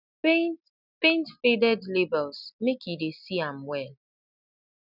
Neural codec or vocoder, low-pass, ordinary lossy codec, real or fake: none; 5.4 kHz; none; real